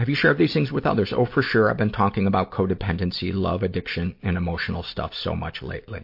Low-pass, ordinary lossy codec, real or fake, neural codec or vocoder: 5.4 kHz; MP3, 32 kbps; real; none